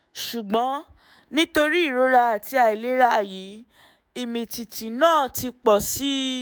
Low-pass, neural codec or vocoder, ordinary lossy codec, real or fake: none; autoencoder, 48 kHz, 128 numbers a frame, DAC-VAE, trained on Japanese speech; none; fake